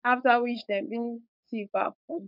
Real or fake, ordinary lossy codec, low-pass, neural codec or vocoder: fake; none; 5.4 kHz; codec, 16 kHz, 4.8 kbps, FACodec